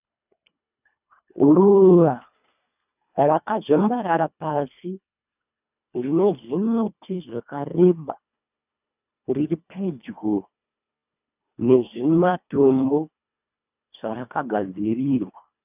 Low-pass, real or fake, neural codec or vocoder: 3.6 kHz; fake; codec, 24 kHz, 1.5 kbps, HILCodec